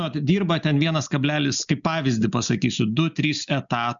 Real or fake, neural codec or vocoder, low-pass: real; none; 7.2 kHz